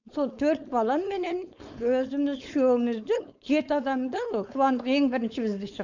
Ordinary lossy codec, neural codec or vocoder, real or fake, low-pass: none; codec, 16 kHz, 4.8 kbps, FACodec; fake; 7.2 kHz